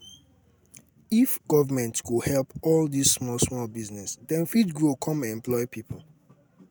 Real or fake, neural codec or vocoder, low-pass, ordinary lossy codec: real; none; none; none